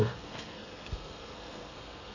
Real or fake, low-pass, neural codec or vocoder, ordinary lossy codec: fake; 7.2 kHz; codec, 32 kHz, 1.9 kbps, SNAC; none